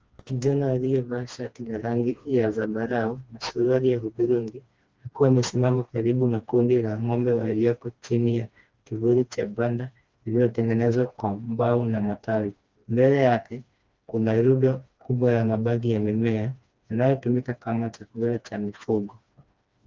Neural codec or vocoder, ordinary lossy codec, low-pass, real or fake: codec, 16 kHz, 2 kbps, FreqCodec, smaller model; Opus, 24 kbps; 7.2 kHz; fake